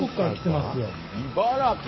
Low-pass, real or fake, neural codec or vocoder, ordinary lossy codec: 7.2 kHz; fake; codec, 44.1 kHz, 7.8 kbps, DAC; MP3, 24 kbps